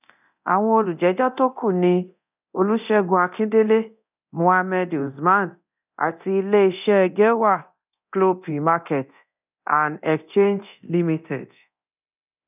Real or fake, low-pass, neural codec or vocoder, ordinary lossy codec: fake; 3.6 kHz; codec, 24 kHz, 0.9 kbps, DualCodec; none